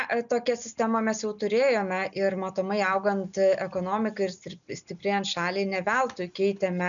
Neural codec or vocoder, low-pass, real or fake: none; 7.2 kHz; real